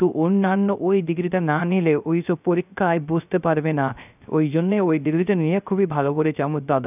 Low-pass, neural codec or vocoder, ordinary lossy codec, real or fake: 3.6 kHz; codec, 16 kHz, 0.3 kbps, FocalCodec; none; fake